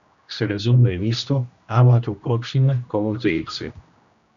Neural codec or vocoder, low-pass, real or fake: codec, 16 kHz, 1 kbps, X-Codec, HuBERT features, trained on general audio; 7.2 kHz; fake